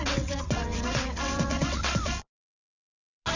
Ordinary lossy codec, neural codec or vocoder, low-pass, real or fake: none; vocoder, 22.05 kHz, 80 mel bands, WaveNeXt; 7.2 kHz; fake